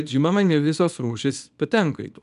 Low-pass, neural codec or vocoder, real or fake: 10.8 kHz; codec, 24 kHz, 0.9 kbps, WavTokenizer, small release; fake